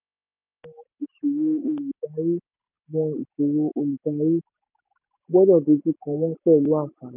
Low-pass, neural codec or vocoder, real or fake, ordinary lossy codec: 3.6 kHz; none; real; none